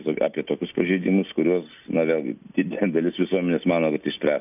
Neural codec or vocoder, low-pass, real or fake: none; 3.6 kHz; real